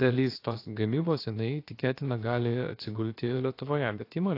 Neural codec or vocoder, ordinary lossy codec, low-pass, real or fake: codec, 16 kHz, about 1 kbps, DyCAST, with the encoder's durations; AAC, 32 kbps; 5.4 kHz; fake